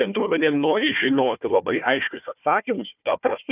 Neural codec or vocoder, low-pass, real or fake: codec, 16 kHz, 1 kbps, FunCodec, trained on Chinese and English, 50 frames a second; 3.6 kHz; fake